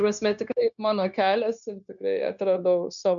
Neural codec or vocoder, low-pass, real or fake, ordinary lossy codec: codec, 16 kHz, 0.9 kbps, LongCat-Audio-Codec; 7.2 kHz; fake; MP3, 96 kbps